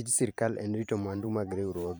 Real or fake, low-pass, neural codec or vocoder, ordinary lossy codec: fake; none; vocoder, 44.1 kHz, 128 mel bands every 512 samples, BigVGAN v2; none